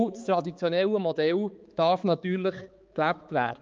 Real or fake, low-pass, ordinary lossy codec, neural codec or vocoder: fake; 7.2 kHz; Opus, 24 kbps; codec, 16 kHz, 4 kbps, X-Codec, HuBERT features, trained on balanced general audio